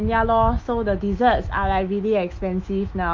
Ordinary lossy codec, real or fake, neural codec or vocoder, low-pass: Opus, 24 kbps; fake; autoencoder, 48 kHz, 128 numbers a frame, DAC-VAE, trained on Japanese speech; 7.2 kHz